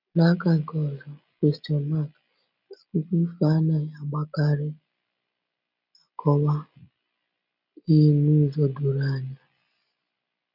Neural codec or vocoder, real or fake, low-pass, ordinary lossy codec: none; real; 5.4 kHz; none